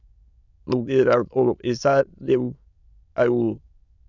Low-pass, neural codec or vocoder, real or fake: 7.2 kHz; autoencoder, 22.05 kHz, a latent of 192 numbers a frame, VITS, trained on many speakers; fake